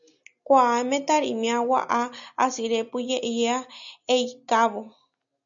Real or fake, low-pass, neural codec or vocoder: real; 7.2 kHz; none